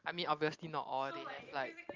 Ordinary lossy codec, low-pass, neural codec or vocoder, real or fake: Opus, 24 kbps; 7.2 kHz; none; real